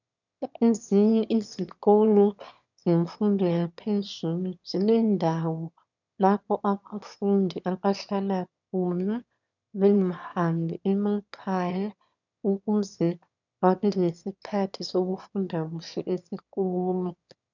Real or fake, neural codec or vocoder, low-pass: fake; autoencoder, 22.05 kHz, a latent of 192 numbers a frame, VITS, trained on one speaker; 7.2 kHz